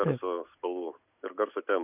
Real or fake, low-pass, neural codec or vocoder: real; 3.6 kHz; none